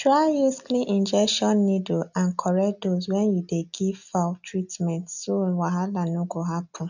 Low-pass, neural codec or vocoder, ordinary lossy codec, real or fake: 7.2 kHz; none; none; real